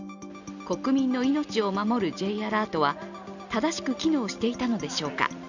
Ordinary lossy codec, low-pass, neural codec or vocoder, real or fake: none; 7.2 kHz; none; real